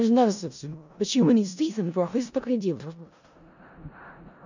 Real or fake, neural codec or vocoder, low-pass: fake; codec, 16 kHz in and 24 kHz out, 0.4 kbps, LongCat-Audio-Codec, four codebook decoder; 7.2 kHz